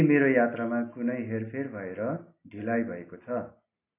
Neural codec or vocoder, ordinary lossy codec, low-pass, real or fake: none; AAC, 24 kbps; 3.6 kHz; real